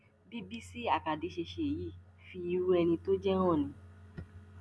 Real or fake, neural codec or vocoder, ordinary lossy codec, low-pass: real; none; none; none